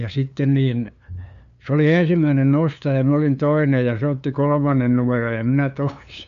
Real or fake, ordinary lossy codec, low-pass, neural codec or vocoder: fake; MP3, 96 kbps; 7.2 kHz; codec, 16 kHz, 2 kbps, FunCodec, trained on LibriTTS, 25 frames a second